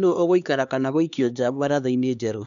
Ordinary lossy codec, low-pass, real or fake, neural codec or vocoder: MP3, 96 kbps; 7.2 kHz; fake; codec, 16 kHz, 2 kbps, X-Codec, HuBERT features, trained on LibriSpeech